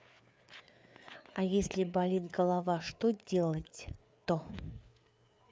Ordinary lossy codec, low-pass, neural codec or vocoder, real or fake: none; none; codec, 16 kHz, 4 kbps, FreqCodec, larger model; fake